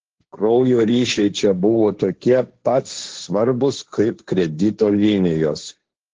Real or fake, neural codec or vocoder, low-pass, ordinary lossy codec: fake; codec, 16 kHz, 1.1 kbps, Voila-Tokenizer; 7.2 kHz; Opus, 16 kbps